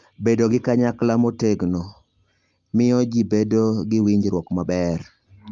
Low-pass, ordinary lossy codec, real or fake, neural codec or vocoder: 7.2 kHz; Opus, 32 kbps; real; none